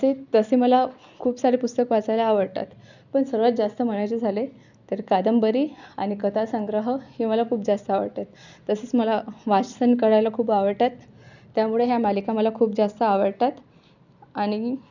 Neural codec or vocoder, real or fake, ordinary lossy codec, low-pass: none; real; none; 7.2 kHz